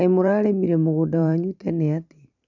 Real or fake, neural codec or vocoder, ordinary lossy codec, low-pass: fake; vocoder, 44.1 kHz, 128 mel bands every 512 samples, BigVGAN v2; none; 7.2 kHz